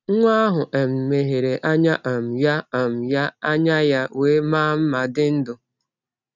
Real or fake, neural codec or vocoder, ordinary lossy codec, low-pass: real; none; none; 7.2 kHz